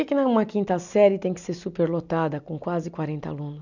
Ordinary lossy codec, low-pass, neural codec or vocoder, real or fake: none; 7.2 kHz; none; real